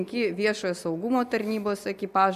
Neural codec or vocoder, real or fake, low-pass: none; real; 14.4 kHz